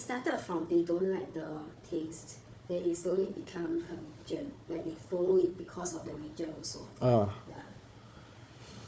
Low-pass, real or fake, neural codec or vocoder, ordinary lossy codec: none; fake; codec, 16 kHz, 4 kbps, FunCodec, trained on Chinese and English, 50 frames a second; none